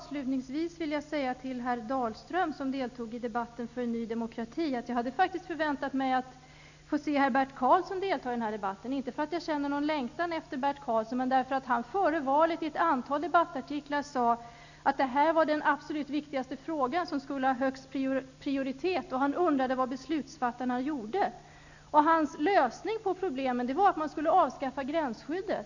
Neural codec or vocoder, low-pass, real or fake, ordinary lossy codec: none; 7.2 kHz; real; none